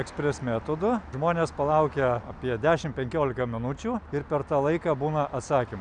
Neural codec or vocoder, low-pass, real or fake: none; 9.9 kHz; real